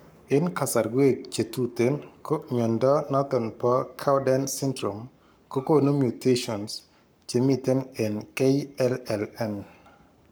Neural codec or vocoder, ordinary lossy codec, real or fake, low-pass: codec, 44.1 kHz, 7.8 kbps, Pupu-Codec; none; fake; none